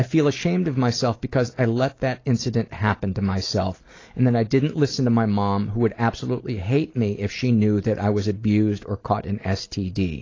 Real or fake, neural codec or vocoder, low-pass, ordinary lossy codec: real; none; 7.2 kHz; AAC, 32 kbps